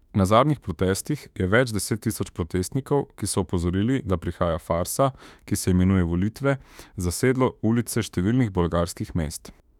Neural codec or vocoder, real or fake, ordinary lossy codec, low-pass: autoencoder, 48 kHz, 32 numbers a frame, DAC-VAE, trained on Japanese speech; fake; none; 19.8 kHz